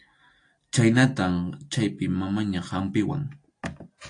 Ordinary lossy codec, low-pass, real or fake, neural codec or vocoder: AAC, 48 kbps; 9.9 kHz; real; none